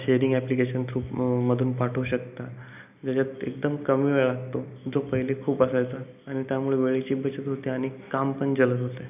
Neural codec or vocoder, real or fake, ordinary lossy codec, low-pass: none; real; none; 3.6 kHz